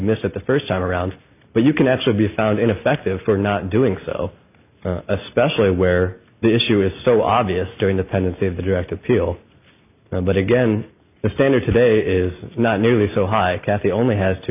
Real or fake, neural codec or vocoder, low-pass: real; none; 3.6 kHz